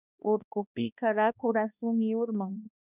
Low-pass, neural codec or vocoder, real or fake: 3.6 kHz; codec, 16 kHz, 1 kbps, X-Codec, HuBERT features, trained on balanced general audio; fake